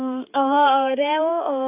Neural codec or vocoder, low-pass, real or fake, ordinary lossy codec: codec, 16 kHz, 4 kbps, X-Codec, HuBERT features, trained on balanced general audio; 3.6 kHz; fake; AAC, 32 kbps